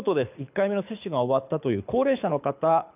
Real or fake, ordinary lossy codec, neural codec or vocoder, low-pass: fake; none; codec, 24 kHz, 6 kbps, HILCodec; 3.6 kHz